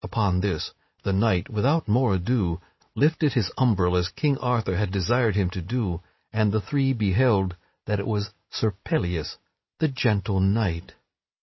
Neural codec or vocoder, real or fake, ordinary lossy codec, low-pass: none; real; MP3, 24 kbps; 7.2 kHz